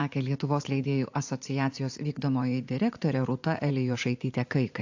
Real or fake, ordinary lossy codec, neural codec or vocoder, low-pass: fake; AAC, 48 kbps; vocoder, 44.1 kHz, 80 mel bands, Vocos; 7.2 kHz